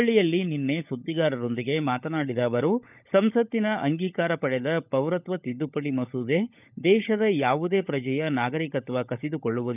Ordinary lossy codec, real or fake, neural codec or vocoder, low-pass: none; fake; codec, 16 kHz, 16 kbps, FunCodec, trained on LibriTTS, 50 frames a second; 3.6 kHz